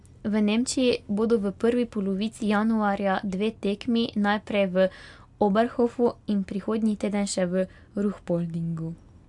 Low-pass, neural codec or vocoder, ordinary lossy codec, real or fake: 10.8 kHz; none; AAC, 64 kbps; real